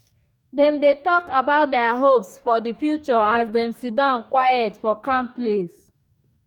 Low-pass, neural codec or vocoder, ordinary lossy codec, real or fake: 19.8 kHz; codec, 44.1 kHz, 2.6 kbps, DAC; none; fake